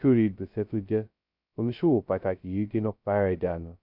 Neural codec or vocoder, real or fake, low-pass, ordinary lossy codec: codec, 16 kHz, 0.2 kbps, FocalCodec; fake; 5.4 kHz; none